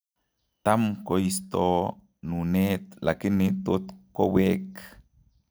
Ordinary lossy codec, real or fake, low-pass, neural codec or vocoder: none; real; none; none